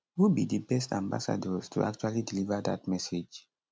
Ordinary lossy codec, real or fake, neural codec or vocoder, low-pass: none; real; none; none